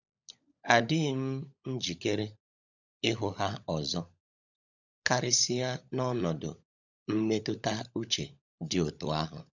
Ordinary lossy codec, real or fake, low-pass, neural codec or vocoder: none; fake; 7.2 kHz; codec, 16 kHz, 16 kbps, FunCodec, trained on LibriTTS, 50 frames a second